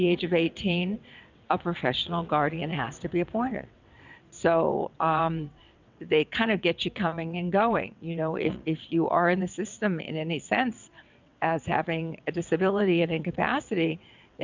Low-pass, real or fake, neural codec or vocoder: 7.2 kHz; fake; vocoder, 22.05 kHz, 80 mel bands, WaveNeXt